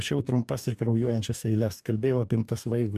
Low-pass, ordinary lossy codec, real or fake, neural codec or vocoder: 14.4 kHz; MP3, 96 kbps; fake; codec, 44.1 kHz, 2.6 kbps, DAC